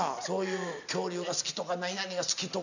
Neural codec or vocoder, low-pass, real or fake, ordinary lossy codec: none; 7.2 kHz; real; none